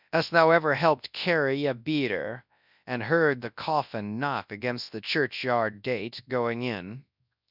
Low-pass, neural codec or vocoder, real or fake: 5.4 kHz; codec, 24 kHz, 0.9 kbps, WavTokenizer, large speech release; fake